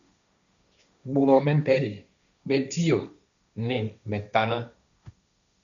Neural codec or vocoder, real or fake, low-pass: codec, 16 kHz, 1.1 kbps, Voila-Tokenizer; fake; 7.2 kHz